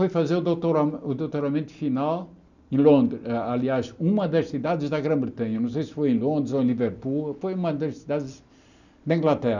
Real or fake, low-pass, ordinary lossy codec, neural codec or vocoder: real; 7.2 kHz; none; none